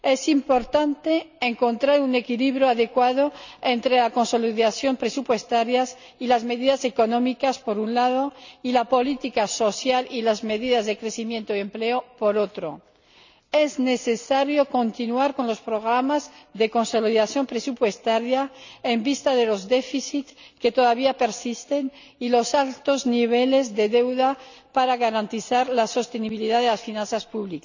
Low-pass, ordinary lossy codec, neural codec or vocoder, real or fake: 7.2 kHz; none; none; real